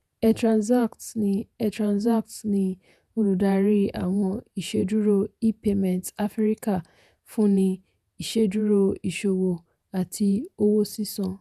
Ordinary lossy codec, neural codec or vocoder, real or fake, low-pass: none; vocoder, 48 kHz, 128 mel bands, Vocos; fake; 14.4 kHz